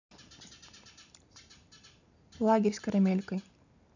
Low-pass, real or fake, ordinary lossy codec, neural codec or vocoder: 7.2 kHz; real; none; none